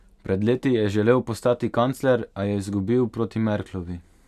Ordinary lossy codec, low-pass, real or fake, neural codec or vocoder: none; 14.4 kHz; real; none